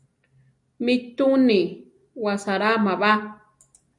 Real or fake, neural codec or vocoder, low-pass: real; none; 10.8 kHz